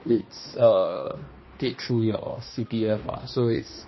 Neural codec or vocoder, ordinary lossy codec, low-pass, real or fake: codec, 16 kHz, 1 kbps, X-Codec, HuBERT features, trained on balanced general audio; MP3, 24 kbps; 7.2 kHz; fake